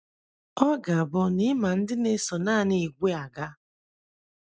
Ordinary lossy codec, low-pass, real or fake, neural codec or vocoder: none; none; real; none